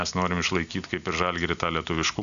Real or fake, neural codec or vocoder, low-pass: real; none; 7.2 kHz